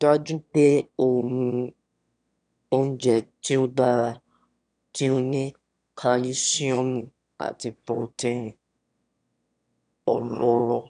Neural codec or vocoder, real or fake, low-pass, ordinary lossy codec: autoencoder, 22.05 kHz, a latent of 192 numbers a frame, VITS, trained on one speaker; fake; 9.9 kHz; none